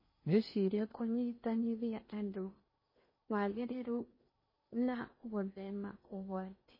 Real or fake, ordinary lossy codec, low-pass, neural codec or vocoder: fake; MP3, 24 kbps; 5.4 kHz; codec, 16 kHz in and 24 kHz out, 0.8 kbps, FocalCodec, streaming, 65536 codes